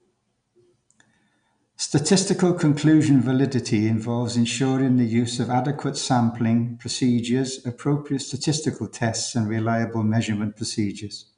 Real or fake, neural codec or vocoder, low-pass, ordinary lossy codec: real; none; 9.9 kHz; none